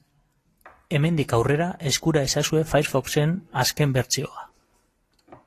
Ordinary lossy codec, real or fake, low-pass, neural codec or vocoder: AAC, 48 kbps; real; 14.4 kHz; none